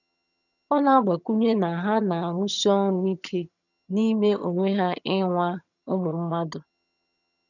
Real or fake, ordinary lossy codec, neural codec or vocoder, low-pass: fake; none; vocoder, 22.05 kHz, 80 mel bands, HiFi-GAN; 7.2 kHz